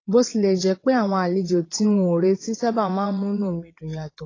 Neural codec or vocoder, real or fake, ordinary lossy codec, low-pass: vocoder, 22.05 kHz, 80 mel bands, WaveNeXt; fake; AAC, 32 kbps; 7.2 kHz